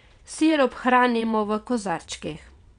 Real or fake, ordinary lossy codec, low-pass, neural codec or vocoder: fake; none; 9.9 kHz; vocoder, 22.05 kHz, 80 mel bands, WaveNeXt